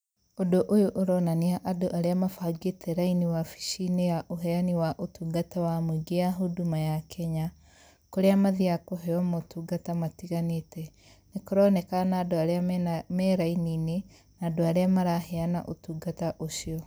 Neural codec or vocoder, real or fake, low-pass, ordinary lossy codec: none; real; none; none